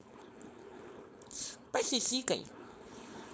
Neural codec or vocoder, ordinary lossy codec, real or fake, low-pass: codec, 16 kHz, 4.8 kbps, FACodec; none; fake; none